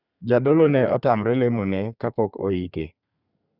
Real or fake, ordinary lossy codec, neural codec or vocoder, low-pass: fake; none; codec, 32 kHz, 1.9 kbps, SNAC; 5.4 kHz